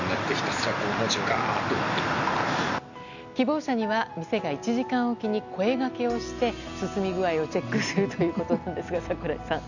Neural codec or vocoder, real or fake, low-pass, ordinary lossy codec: none; real; 7.2 kHz; none